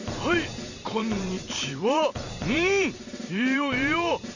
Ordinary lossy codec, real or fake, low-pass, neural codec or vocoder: none; fake; 7.2 kHz; vocoder, 44.1 kHz, 128 mel bands every 512 samples, BigVGAN v2